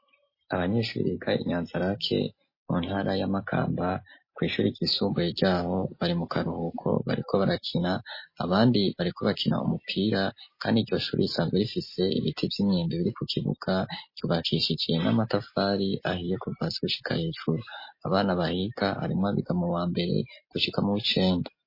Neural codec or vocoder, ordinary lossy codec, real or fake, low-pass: none; MP3, 24 kbps; real; 5.4 kHz